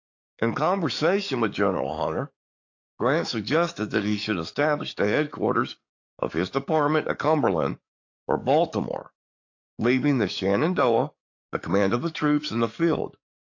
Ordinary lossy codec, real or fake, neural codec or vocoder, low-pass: AAC, 48 kbps; fake; codec, 44.1 kHz, 7.8 kbps, DAC; 7.2 kHz